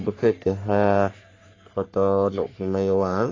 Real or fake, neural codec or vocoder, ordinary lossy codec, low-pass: fake; codec, 44.1 kHz, 3.4 kbps, Pupu-Codec; AAC, 32 kbps; 7.2 kHz